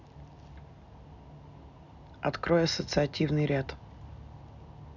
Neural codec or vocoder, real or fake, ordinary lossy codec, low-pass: none; real; none; 7.2 kHz